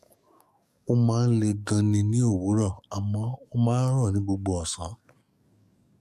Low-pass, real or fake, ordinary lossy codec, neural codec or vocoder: 14.4 kHz; fake; none; codec, 44.1 kHz, 7.8 kbps, DAC